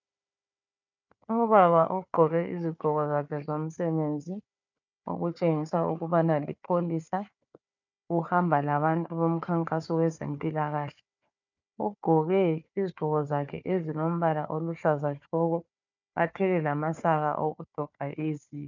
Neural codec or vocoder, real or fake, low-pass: codec, 16 kHz, 4 kbps, FunCodec, trained on Chinese and English, 50 frames a second; fake; 7.2 kHz